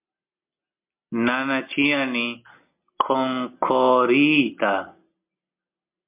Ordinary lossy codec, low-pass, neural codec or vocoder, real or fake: MP3, 32 kbps; 3.6 kHz; none; real